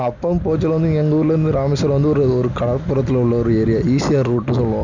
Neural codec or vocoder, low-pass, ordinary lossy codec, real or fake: none; 7.2 kHz; none; real